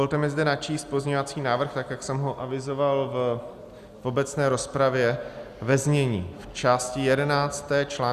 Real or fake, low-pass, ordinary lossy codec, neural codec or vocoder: real; 14.4 kHz; Opus, 64 kbps; none